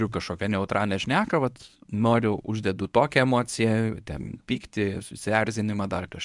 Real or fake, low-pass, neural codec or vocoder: fake; 10.8 kHz; codec, 24 kHz, 0.9 kbps, WavTokenizer, medium speech release version 1